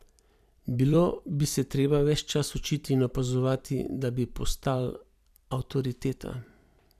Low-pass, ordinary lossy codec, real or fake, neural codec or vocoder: 14.4 kHz; none; fake; vocoder, 44.1 kHz, 128 mel bands every 256 samples, BigVGAN v2